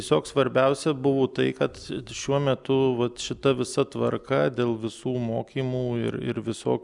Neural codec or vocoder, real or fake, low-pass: none; real; 10.8 kHz